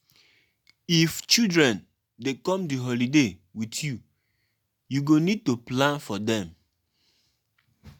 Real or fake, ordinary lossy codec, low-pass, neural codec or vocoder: real; none; 19.8 kHz; none